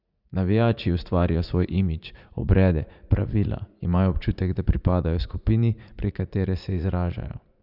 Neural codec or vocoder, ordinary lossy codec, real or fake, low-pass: vocoder, 44.1 kHz, 80 mel bands, Vocos; none; fake; 5.4 kHz